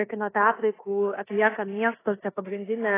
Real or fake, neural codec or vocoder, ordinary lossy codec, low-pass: fake; codec, 16 kHz in and 24 kHz out, 0.9 kbps, LongCat-Audio-Codec, four codebook decoder; AAC, 16 kbps; 3.6 kHz